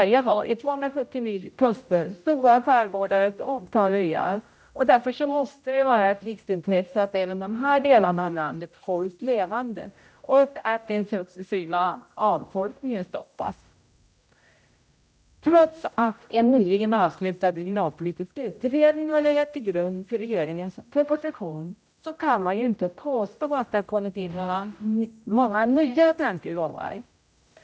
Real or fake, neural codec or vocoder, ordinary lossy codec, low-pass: fake; codec, 16 kHz, 0.5 kbps, X-Codec, HuBERT features, trained on general audio; none; none